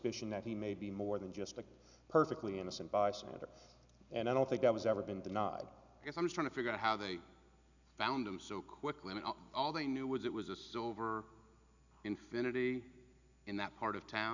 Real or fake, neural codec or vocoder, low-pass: real; none; 7.2 kHz